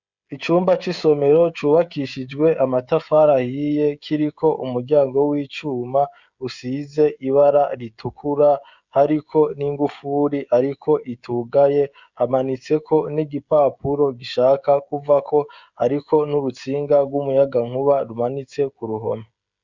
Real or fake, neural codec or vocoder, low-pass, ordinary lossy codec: fake; codec, 16 kHz, 16 kbps, FreqCodec, smaller model; 7.2 kHz; Opus, 64 kbps